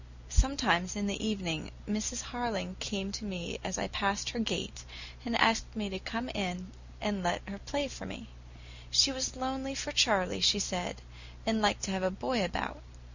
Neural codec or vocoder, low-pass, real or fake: none; 7.2 kHz; real